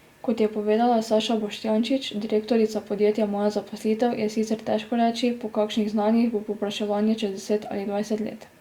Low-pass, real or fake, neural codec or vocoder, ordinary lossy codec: 19.8 kHz; real; none; Opus, 64 kbps